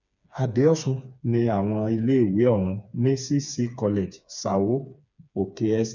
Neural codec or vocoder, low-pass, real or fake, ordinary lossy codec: codec, 16 kHz, 4 kbps, FreqCodec, smaller model; 7.2 kHz; fake; none